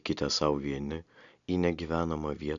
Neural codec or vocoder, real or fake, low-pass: none; real; 7.2 kHz